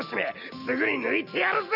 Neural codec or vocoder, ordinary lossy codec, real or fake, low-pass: none; none; real; 5.4 kHz